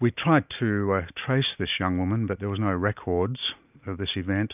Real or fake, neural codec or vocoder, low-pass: real; none; 3.6 kHz